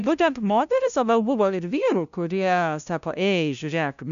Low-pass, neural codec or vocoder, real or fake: 7.2 kHz; codec, 16 kHz, 0.5 kbps, FunCodec, trained on LibriTTS, 25 frames a second; fake